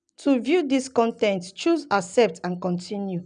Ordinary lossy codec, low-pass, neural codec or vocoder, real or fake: none; 9.9 kHz; none; real